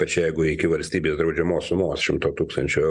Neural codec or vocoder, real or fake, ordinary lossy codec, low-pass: none; real; Opus, 64 kbps; 10.8 kHz